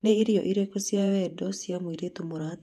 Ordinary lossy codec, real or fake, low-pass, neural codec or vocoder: none; fake; 14.4 kHz; vocoder, 48 kHz, 128 mel bands, Vocos